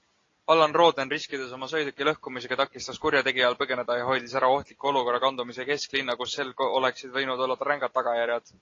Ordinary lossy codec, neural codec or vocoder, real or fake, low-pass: AAC, 32 kbps; none; real; 7.2 kHz